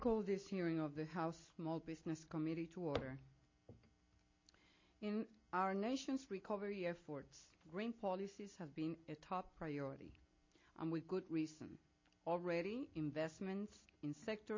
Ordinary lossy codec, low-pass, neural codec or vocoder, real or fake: MP3, 32 kbps; 7.2 kHz; none; real